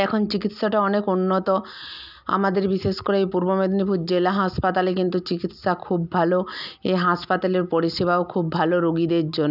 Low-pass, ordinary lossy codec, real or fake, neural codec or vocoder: 5.4 kHz; none; real; none